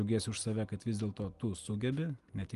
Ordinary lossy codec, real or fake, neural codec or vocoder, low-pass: Opus, 32 kbps; real; none; 10.8 kHz